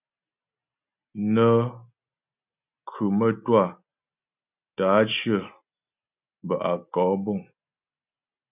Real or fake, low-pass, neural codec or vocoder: real; 3.6 kHz; none